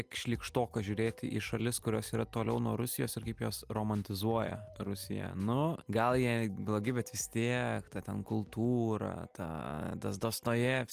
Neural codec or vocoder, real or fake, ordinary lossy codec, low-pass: none; real; Opus, 32 kbps; 14.4 kHz